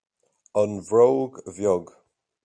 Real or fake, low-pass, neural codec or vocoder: real; 9.9 kHz; none